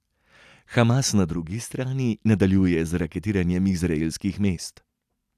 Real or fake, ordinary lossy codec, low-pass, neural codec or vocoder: real; none; 14.4 kHz; none